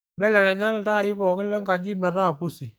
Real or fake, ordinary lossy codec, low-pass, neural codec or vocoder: fake; none; none; codec, 44.1 kHz, 2.6 kbps, SNAC